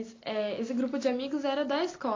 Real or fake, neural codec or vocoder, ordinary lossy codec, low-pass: real; none; AAC, 32 kbps; 7.2 kHz